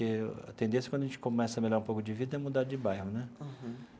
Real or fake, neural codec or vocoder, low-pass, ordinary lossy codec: real; none; none; none